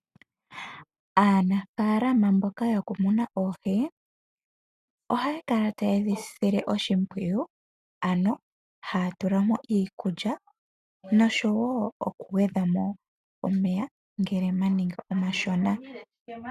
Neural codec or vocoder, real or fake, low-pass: none; real; 14.4 kHz